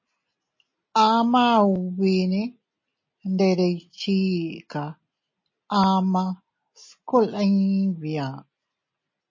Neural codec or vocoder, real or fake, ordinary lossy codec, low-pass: none; real; MP3, 32 kbps; 7.2 kHz